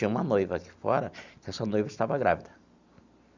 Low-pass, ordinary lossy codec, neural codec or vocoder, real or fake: 7.2 kHz; Opus, 64 kbps; none; real